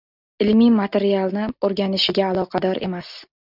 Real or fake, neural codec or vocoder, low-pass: real; none; 5.4 kHz